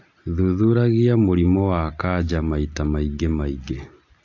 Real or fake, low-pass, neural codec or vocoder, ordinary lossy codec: real; 7.2 kHz; none; AAC, 48 kbps